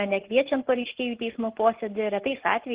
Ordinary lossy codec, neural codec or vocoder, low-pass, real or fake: Opus, 16 kbps; none; 3.6 kHz; real